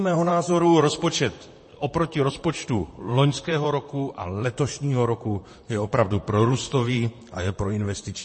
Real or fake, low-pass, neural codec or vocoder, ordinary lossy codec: fake; 10.8 kHz; vocoder, 44.1 kHz, 128 mel bands, Pupu-Vocoder; MP3, 32 kbps